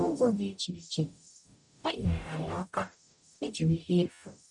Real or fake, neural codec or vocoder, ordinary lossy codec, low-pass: fake; codec, 44.1 kHz, 0.9 kbps, DAC; none; 10.8 kHz